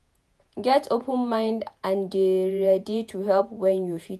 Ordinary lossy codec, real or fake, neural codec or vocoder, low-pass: none; fake; vocoder, 48 kHz, 128 mel bands, Vocos; 14.4 kHz